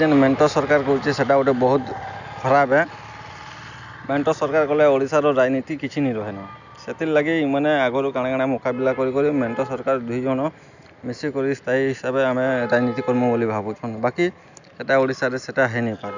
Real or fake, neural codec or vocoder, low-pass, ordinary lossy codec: real; none; 7.2 kHz; none